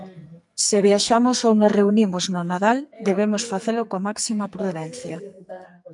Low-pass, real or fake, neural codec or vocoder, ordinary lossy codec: 10.8 kHz; fake; codec, 44.1 kHz, 2.6 kbps, SNAC; MP3, 96 kbps